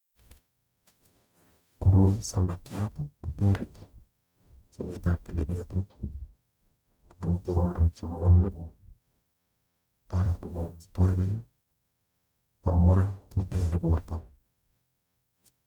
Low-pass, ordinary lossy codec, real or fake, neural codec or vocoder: 19.8 kHz; none; fake; codec, 44.1 kHz, 0.9 kbps, DAC